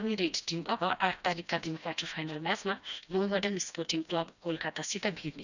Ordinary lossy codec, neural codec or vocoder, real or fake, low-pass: none; codec, 16 kHz, 1 kbps, FreqCodec, smaller model; fake; 7.2 kHz